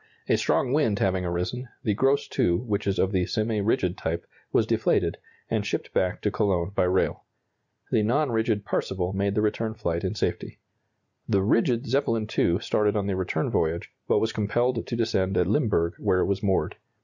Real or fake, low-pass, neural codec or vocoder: real; 7.2 kHz; none